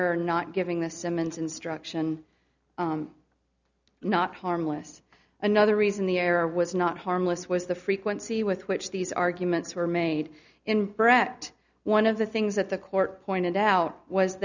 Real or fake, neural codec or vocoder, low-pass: real; none; 7.2 kHz